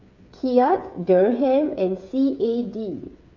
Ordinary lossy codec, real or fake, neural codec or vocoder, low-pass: none; fake; codec, 16 kHz, 8 kbps, FreqCodec, smaller model; 7.2 kHz